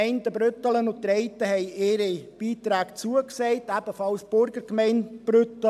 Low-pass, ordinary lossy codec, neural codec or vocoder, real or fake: 14.4 kHz; AAC, 96 kbps; none; real